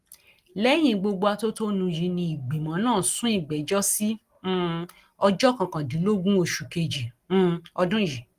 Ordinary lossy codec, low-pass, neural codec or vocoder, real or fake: Opus, 24 kbps; 14.4 kHz; none; real